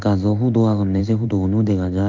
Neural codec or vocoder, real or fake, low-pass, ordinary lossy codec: codec, 16 kHz in and 24 kHz out, 1 kbps, XY-Tokenizer; fake; 7.2 kHz; Opus, 24 kbps